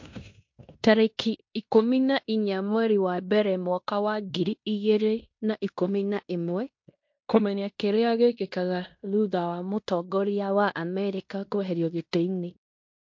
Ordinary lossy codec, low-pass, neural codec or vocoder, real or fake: MP3, 48 kbps; 7.2 kHz; codec, 16 kHz in and 24 kHz out, 0.9 kbps, LongCat-Audio-Codec, fine tuned four codebook decoder; fake